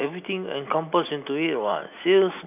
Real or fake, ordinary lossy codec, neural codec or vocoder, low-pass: real; none; none; 3.6 kHz